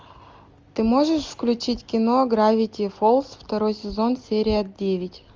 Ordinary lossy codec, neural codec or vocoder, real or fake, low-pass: Opus, 32 kbps; none; real; 7.2 kHz